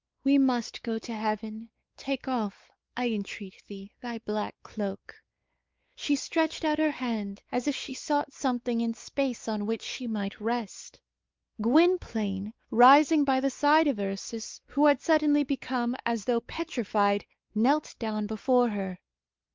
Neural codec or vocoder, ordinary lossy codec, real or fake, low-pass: codec, 16 kHz, 4 kbps, X-Codec, WavLM features, trained on Multilingual LibriSpeech; Opus, 32 kbps; fake; 7.2 kHz